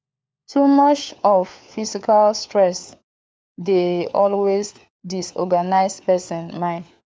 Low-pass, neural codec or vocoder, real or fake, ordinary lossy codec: none; codec, 16 kHz, 4 kbps, FunCodec, trained on LibriTTS, 50 frames a second; fake; none